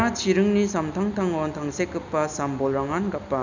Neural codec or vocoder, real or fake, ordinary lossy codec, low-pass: none; real; none; 7.2 kHz